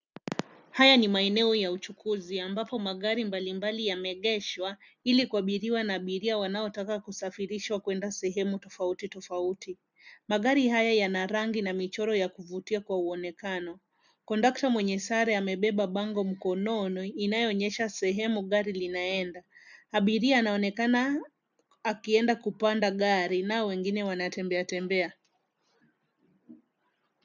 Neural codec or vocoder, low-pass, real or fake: none; 7.2 kHz; real